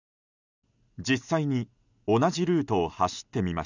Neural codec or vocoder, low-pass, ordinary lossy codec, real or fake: none; 7.2 kHz; none; real